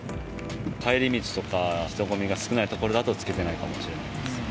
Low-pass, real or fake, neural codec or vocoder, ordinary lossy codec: none; real; none; none